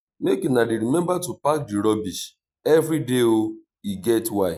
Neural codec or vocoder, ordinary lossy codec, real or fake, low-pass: none; none; real; 19.8 kHz